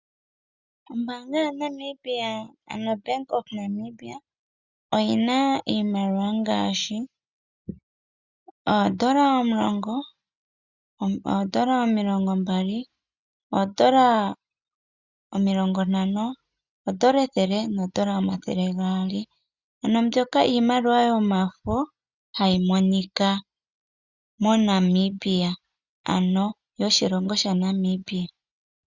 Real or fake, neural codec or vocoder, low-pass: real; none; 7.2 kHz